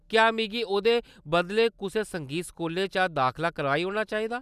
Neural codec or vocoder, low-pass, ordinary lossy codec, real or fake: none; 14.4 kHz; none; real